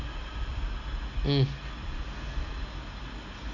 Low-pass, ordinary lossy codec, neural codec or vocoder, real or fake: 7.2 kHz; none; none; real